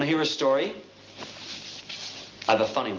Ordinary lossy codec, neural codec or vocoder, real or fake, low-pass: Opus, 32 kbps; none; real; 7.2 kHz